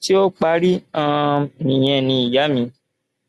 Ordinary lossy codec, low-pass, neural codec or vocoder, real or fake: Opus, 64 kbps; 14.4 kHz; none; real